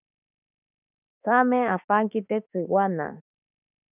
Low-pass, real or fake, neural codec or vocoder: 3.6 kHz; fake; autoencoder, 48 kHz, 32 numbers a frame, DAC-VAE, trained on Japanese speech